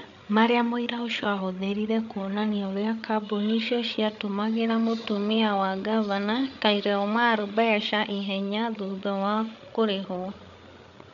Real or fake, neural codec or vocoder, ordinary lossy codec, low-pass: fake; codec, 16 kHz, 8 kbps, FreqCodec, larger model; none; 7.2 kHz